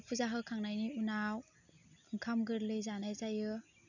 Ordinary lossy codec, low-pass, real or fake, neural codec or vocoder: none; 7.2 kHz; real; none